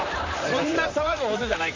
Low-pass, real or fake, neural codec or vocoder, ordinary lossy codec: 7.2 kHz; fake; vocoder, 44.1 kHz, 128 mel bands, Pupu-Vocoder; MP3, 64 kbps